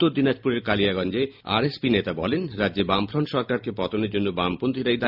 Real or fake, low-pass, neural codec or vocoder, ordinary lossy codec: real; 5.4 kHz; none; none